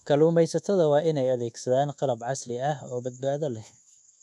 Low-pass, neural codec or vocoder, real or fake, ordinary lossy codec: none; codec, 24 kHz, 1.2 kbps, DualCodec; fake; none